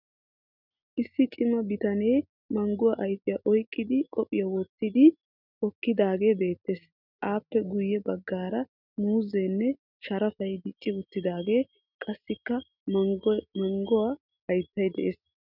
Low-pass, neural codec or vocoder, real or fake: 5.4 kHz; none; real